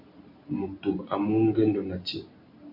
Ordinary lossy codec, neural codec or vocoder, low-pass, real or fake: AAC, 48 kbps; none; 5.4 kHz; real